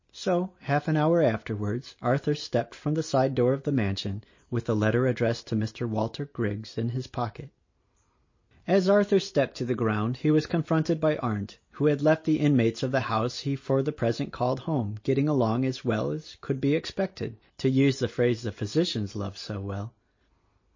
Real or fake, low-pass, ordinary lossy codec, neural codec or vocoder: real; 7.2 kHz; MP3, 32 kbps; none